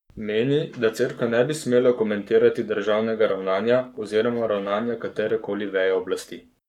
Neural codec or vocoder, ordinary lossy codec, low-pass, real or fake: codec, 44.1 kHz, 7.8 kbps, Pupu-Codec; none; 19.8 kHz; fake